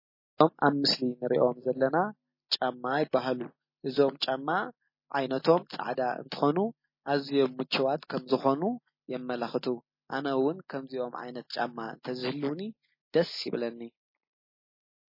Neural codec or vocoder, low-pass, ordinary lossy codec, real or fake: none; 5.4 kHz; MP3, 24 kbps; real